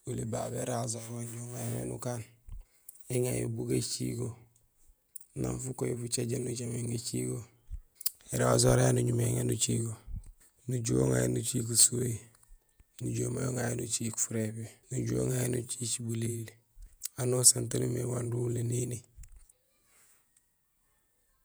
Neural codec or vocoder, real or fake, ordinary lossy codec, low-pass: vocoder, 48 kHz, 128 mel bands, Vocos; fake; none; none